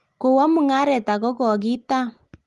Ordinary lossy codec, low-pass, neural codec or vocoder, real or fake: Opus, 24 kbps; 10.8 kHz; none; real